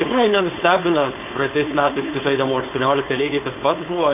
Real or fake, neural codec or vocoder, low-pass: fake; codec, 16 kHz, 4.8 kbps, FACodec; 3.6 kHz